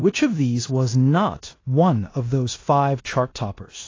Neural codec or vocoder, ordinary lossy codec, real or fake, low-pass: codec, 16 kHz in and 24 kHz out, 0.9 kbps, LongCat-Audio-Codec, four codebook decoder; AAC, 32 kbps; fake; 7.2 kHz